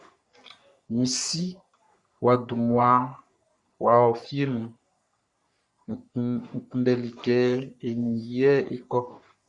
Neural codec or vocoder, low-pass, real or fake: codec, 44.1 kHz, 3.4 kbps, Pupu-Codec; 10.8 kHz; fake